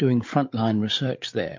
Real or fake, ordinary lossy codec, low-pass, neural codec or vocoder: fake; MP3, 48 kbps; 7.2 kHz; codec, 16 kHz, 8 kbps, FreqCodec, larger model